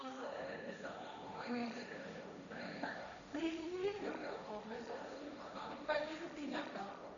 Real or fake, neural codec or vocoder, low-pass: fake; codec, 16 kHz, 1.1 kbps, Voila-Tokenizer; 7.2 kHz